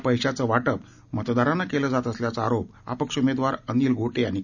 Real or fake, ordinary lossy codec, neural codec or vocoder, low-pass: real; none; none; 7.2 kHz